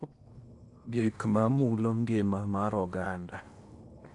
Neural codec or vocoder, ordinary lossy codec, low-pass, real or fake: codec, 16 kHz in and 24 kHz out, 0.8 kbps, FocalCodec, streaming, 65536 codes; MP3, 96 kbps; 10.8 kHz; fake